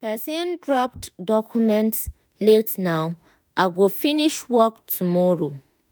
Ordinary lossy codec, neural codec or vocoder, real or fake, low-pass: none; autoencoder, 48 kHz, 32 numbers a frame, DAC-VAE, trained on Japanese speech; fake; none